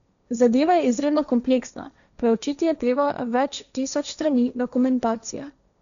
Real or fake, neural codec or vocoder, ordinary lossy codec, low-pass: fake; codec, 16 kHz, 1.1 kbps, Voila-Tokenizer; none; 7.2 kHz